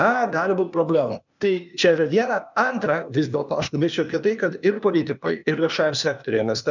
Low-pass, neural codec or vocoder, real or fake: 7.2 kHz; codec, 16 kHz, 0.8 kbps, ZipCodec; fake